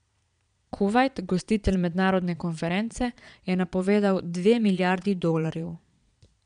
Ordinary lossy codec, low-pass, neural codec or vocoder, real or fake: none; 9.9 kHz; vocoder, 22.05 kHz, 80 mel bands, Vocos; fake